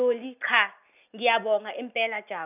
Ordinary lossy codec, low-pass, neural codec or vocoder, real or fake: none; 3.6 kHz; none; real